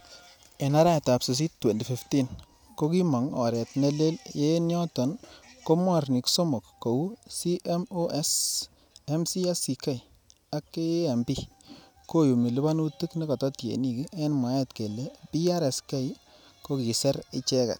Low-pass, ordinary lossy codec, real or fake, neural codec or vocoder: none; none; real; none